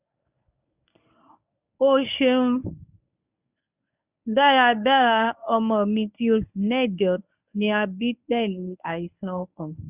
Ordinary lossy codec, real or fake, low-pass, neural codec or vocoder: none; fake; 3.6 kHz; codec, 24 kHz, 0.9 kbps, WavTokenizer, medium speech release version 1